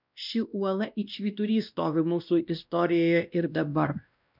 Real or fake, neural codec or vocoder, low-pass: fake; codec, 16 kHz, 1 kbps, X-Codec, WavLM features, trained on Multilingual LibriSpeech; 5.4 kHz